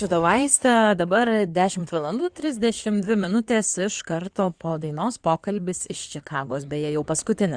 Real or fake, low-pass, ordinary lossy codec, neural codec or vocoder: fake; 9.9 kHz; Opus, 64 kbps; codec, 16 kHz in and 24 kHz out, 2.2 kbps, FireRedTTS-2 codec